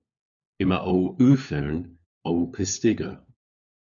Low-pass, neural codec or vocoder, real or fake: 7.2 kHz; codec, 16 kHz, 4 kbps, FunCodec, trained on LibriTTS, 50 frames a second; fake